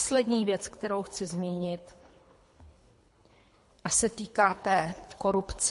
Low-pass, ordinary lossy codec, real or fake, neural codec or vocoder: 10.8 kHz; MP3, 48 kbps; fake; codec, 24 kHz, 3 kbps, HILCodec